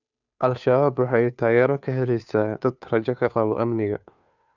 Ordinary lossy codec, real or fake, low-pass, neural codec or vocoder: none; fake; 7.2 kHz; codec, 16 kHz, 2 kbps, FunCodec, trained on Chinese and English, 25 frames a second